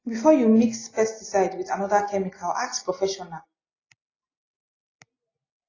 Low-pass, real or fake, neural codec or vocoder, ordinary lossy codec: 7.2 kHz; real; none; AAC, 32 kbps